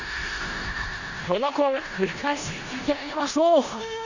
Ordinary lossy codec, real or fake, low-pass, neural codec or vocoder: none; fake; 7.2 kHz; codec, 16 kHz in and 24 kHz out, 0.4 kbps, LongCat-Audio-Codec, four codebook decoder